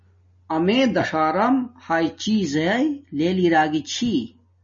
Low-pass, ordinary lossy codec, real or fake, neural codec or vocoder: 7.2 kHz; MP3, 32 kbps; real; none